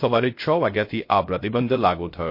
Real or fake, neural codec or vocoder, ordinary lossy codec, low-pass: fake; codec, 16 kHz, 0.3 kbps, FocalCodec; MP3, 32 kbps; 5.4 kHz